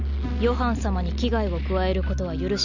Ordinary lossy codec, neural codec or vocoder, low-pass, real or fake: none; none; 7.2 kHz; real